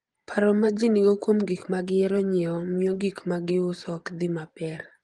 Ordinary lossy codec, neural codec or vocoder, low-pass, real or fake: Opus, 24 kbps; vocoder, 24 kHz, 100 mel bands, Vocos; 10.8 kHz; fake